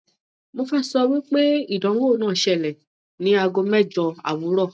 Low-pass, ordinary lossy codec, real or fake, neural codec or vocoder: none; none; real; none